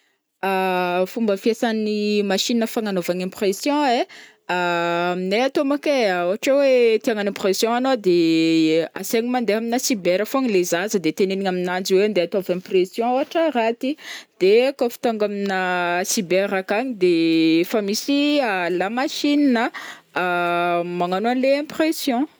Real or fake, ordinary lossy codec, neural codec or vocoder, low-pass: real; none; none; none